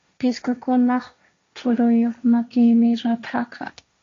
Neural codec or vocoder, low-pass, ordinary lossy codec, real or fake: codec, 16 kHz, 1.1 kbps, Voila-Tokenizer; 7.2 kHz; MP3, 48 kbps; fake